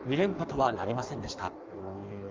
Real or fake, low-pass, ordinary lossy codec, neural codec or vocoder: fake; 7.2 kHz; Opus, 24 kbps; codec, 16 kHz in and 24 kHz out, 1.1 kbps, FireRedTTS-2 codec